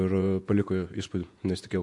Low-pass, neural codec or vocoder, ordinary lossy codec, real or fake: 10.8 kHz; vocoder, 48 kHz, 128 mel bands, Vocos; MP3, 64 kbps; fake